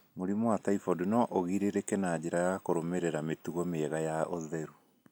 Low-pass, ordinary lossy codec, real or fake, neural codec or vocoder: 19.8 kHz; none; real; none